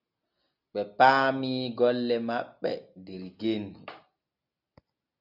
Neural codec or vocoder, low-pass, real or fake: none; 5.4 kHz; real